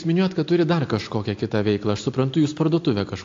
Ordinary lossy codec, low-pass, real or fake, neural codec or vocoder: AAC, 48 kbps; 7.2 kHz; real; none